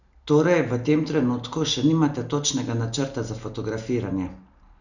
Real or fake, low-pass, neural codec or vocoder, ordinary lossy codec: real; 7.2 kHz; none; none